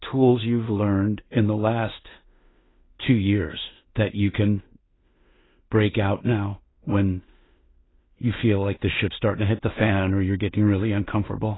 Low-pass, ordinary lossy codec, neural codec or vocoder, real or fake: 7.2 kHz; AAC, 16 kbps; codec, 16 kHz in and 24 kHz out, 0.4 kbps, LongCat-Audio-Codec, two codebook decoder; fake